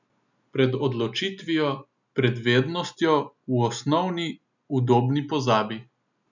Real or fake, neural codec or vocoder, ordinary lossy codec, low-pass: real; none; none; 7.2 kHz